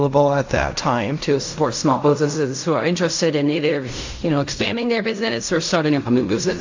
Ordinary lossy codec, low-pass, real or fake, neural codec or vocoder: AAC, 48 kbps; 7.2 kHz; fake; codec, 16 kHz in and 24 kHz out, 0.4 kbps, LongCat-Audio-Codec, fine tuned four codebook decoder